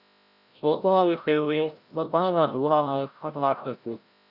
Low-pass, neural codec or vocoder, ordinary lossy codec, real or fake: 5.4 kHz; codec, 16 kHz, 0.5 kbps, FreqCodec, larger model; Opus, 64 kbps; fake